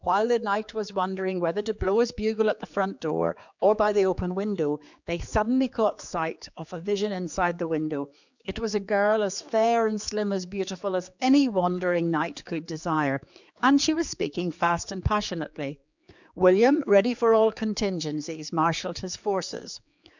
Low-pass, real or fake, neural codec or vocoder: 7.2 kHz; fake; codec, 16 kHz, 4 kbps, X-Codec, HuBERT features, trained on general audio